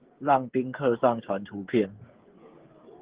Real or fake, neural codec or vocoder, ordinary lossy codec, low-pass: fake; codec, 16 kHz, 8 kbps, FreqCodec, smaller model; Opus, 16 kbps; 3.6 kHz